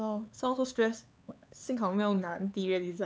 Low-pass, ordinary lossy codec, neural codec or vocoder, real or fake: none; none; codec, 16 kHz, 4 kbps, X-Codec, HuBERT features, trained on LibriSpeech; fake